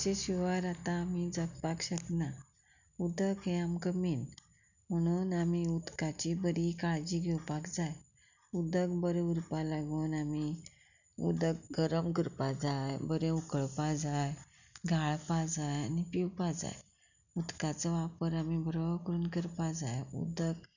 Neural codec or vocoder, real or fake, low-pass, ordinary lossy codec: none; real; 7.2 kHz; none